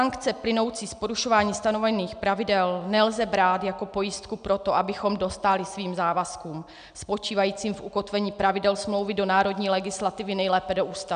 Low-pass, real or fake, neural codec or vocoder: 9.9 kHz; real; none